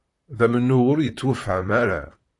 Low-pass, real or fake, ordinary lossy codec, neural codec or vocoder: 10.8 kHz; fake; AAC, 48 kbps; vocoder, 44.1 kHz, 128 mel bands, Pupu-Vocoder